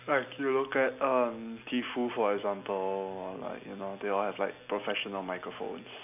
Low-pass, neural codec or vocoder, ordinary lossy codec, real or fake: 3.6 kHz; none; none; real